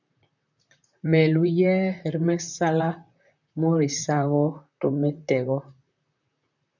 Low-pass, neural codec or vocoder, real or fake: 7.2 kHz; vocoder, 44.1 kHz, 128 mel bands, Pupu-Vocoder; fake